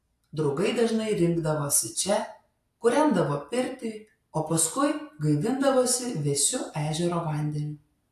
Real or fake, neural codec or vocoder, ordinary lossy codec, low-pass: real; none; AAC, 64 kbps; 14.4 kHz